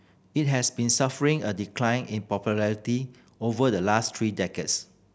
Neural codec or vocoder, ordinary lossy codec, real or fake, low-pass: none; none; real; none